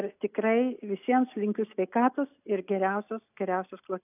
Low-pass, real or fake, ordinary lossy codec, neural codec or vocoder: 3.6 kHz; real; AAC, 32 kbps; none